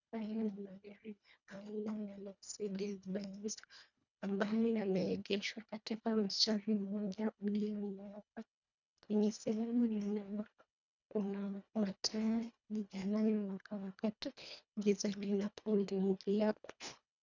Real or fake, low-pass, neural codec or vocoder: fake; 7.2 kHz; codec, 24 kHz, 1.5 kbps, HILCodec